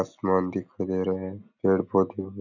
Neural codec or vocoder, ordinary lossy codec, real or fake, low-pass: none; none; real; 7.2 kHz